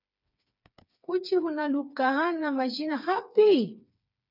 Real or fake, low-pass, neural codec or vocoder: fake; 5.4 kHz; codec, 16 kHz, 4 kbps, FreqCodec, smaller model